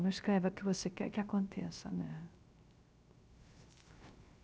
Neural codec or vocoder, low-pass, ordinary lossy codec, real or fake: codec, 16 kHz, 0.3 kbps, FocalCodec; none; none; fake